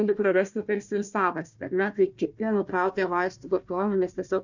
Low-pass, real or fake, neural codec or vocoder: 7.2 kHz; fake; codec, 16 kHz, 1 kbps, FunCodec, trained on Chinese and English, 50 frames a second